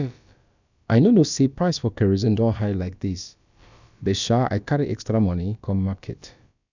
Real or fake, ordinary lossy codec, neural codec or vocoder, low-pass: fake; none; codec, 16 kHz, about 1 kbps, DyCAST, with the encoder's durations; 7.2 kHz